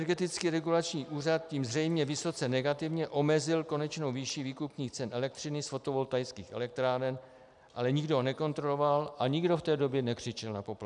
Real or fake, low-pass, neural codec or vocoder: real; 10.8 kHz; none